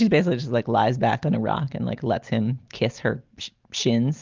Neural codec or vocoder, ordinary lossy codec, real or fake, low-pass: none; Opus, 24 kbps; real; 7.2 kHz